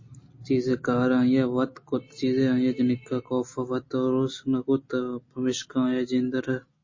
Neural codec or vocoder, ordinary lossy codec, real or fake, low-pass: none; MP3, 32 kbps; real; 7.2 kHz